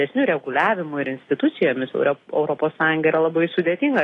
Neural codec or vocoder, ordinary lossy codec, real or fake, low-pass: none; AAC, 32 kbps; real; 10.8 kHz